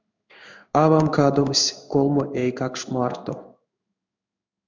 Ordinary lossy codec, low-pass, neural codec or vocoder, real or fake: MP3, 64 kbps; 7.2 kHz; codec, 16 kHz in and 24 kHz out, 1 kbps, XY-Tokenizer; fake